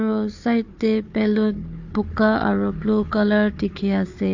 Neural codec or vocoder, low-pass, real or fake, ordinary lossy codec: codec, 16 kHz, 4 kbps, FunCodec, trained on Chinese and English, 50 frames a second; 7.2 kHz; fake; AAC, 48 kbps